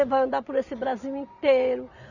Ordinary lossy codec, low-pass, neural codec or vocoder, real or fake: none; 7.2 kHz; none; real